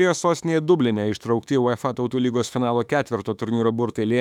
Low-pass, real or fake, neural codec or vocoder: 19.8 kHz; fake; autoencoder, 48 kHz, 32 numbers a frame, DAC-VAE, trained on Japanese speech